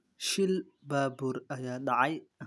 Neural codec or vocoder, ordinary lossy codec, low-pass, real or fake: none; none; none; real